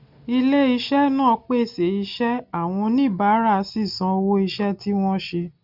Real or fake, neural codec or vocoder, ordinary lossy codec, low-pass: real; none; none; 5.4 kHz